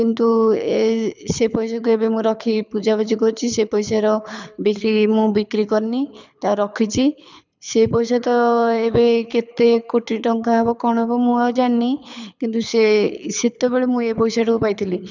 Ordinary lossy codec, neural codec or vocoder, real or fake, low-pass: none; codec, 24 kHz, 6 kbps, HILCodec; fake; 7.2 kHz